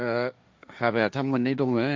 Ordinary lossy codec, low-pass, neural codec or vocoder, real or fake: none; none; codec, 16 kHz, 1.1 kbps, Voila-Tokenizer; fake